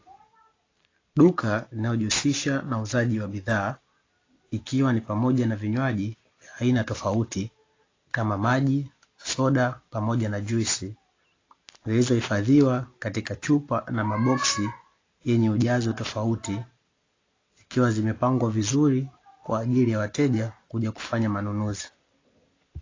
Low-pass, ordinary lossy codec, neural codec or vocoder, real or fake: 7.2 kHz; AAC, 32 kbps; none; real